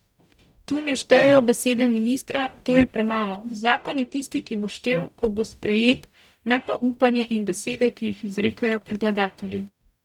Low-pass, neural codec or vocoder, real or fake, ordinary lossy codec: 19.8 kHz; codec, 44.1 kHz, 0.9 kbps, DAC; fake; none